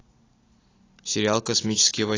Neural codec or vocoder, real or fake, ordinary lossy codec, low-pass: none; real; AAC, 32 kbps; 7.2 kHz